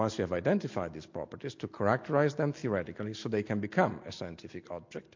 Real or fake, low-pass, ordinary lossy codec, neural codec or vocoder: real; 7.2 kHz; MP3, 48 kbps; none